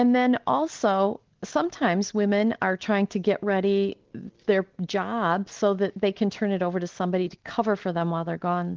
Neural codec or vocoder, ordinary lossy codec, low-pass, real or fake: none; Opus, 16 kbps; 7.2 kHz; real